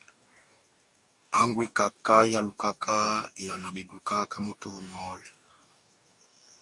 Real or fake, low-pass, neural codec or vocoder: fake; 10.8 kHz; codec, 44.1 kHz, 2.6 kbps, DAC